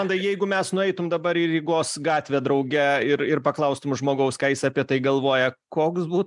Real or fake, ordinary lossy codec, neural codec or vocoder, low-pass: real; MP3, 96 kbps; none; 10.8 kHz